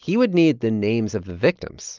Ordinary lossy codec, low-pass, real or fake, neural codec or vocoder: Opus, 24 kbps; 7.2 kHz; real; none